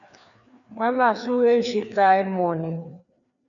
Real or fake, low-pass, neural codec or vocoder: fake; 7.2 kHz; codec, 16 kHz, 2 kbps, FreqCodec, larger model